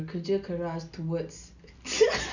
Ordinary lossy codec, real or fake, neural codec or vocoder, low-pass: none; real; none; 7.2 kHz